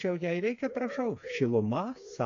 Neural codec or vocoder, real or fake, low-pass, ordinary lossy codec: codec, 16 kHz, 4 kbps, FreqCodec, smaller model; fake; 7.2 kHz; AAC, 48 kbps